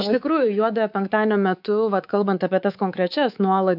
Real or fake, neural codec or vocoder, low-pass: fake; codec, 24 kHz, 3.1 kbps, DualCodec; 5.4 kHz